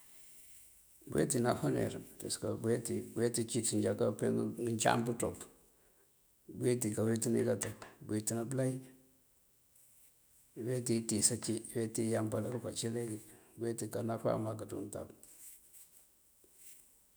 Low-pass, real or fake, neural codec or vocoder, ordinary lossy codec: none; fake; autoencoder, 48 kHz, 128 numbers a frame, DAC-VAE, trained on Japanese speech; none